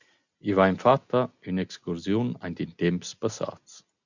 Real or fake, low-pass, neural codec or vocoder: real; 7.2 kHz; none